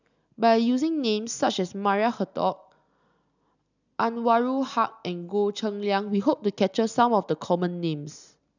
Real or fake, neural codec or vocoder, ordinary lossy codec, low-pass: real; none; none; 7.2 kHz